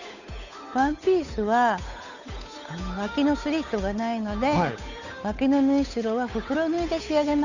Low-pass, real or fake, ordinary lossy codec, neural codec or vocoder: 7.2 kHz; fake; none; codec, 16 kHz, 8 kbps, FunCodec, trained on Chinese and English, 25 frames a second